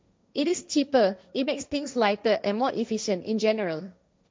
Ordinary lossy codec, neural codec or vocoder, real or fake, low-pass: none; codec, 16 kHz, 1.1 kbps, Voila-Tokenizer; fake; none